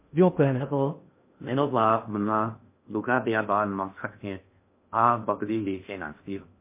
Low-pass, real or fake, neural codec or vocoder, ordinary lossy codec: 3.6 kHz; fake; codec, 16 kHz in and 24 kHz out, 0.6 kbps, FocalCodec, streaming, 2048 codes; MP3, 24 kbps